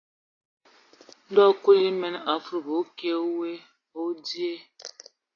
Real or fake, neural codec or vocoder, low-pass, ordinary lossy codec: real; none; 7.2 kHz; AAC, 32 kbps